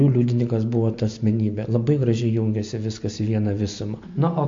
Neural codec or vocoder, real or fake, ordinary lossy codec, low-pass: none; real; MP3, 48 kbps; 7.2 kHz